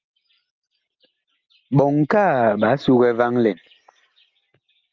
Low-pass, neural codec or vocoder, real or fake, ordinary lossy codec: 7.2 kHz; none; real; Opus, 32 kbps